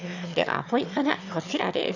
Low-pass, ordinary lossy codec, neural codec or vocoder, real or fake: 7.2 kHz; none; autoencoder, 22.05 kHz, a latent of 192 numbers a frame, VITS, trained on one speaker; fake